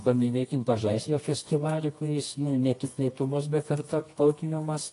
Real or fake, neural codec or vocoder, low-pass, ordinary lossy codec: fake; codec, 24 kHz, 0.9 kbps, WavTokenizer, medium music audio release; 10.8 kHz; AAC, 48 kbps